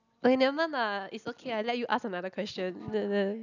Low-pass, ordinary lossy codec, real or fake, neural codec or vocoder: 7.2 kHz; none; real; none